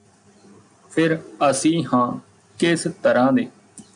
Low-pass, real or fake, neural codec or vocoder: 9.9 kHz; real; none